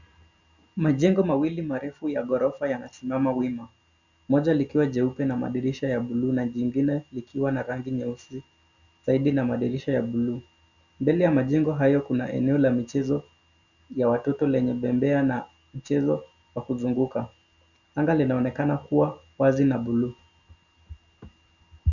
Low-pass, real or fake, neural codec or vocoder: 7.2 kHz; real; none